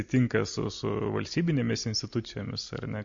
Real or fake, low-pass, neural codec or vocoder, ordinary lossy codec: real; 7.2 kHz; none; MP3, 48 kbps